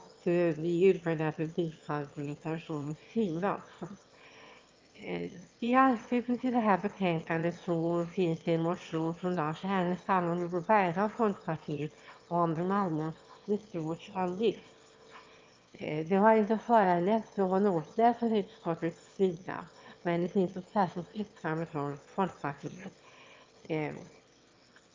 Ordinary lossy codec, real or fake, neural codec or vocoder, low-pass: Opus, 24 kbps; fake; autoencoder, 22.05 kHz, a latent of 192 numbers a frame, VITS, trained on one speaker; 7.2 kHz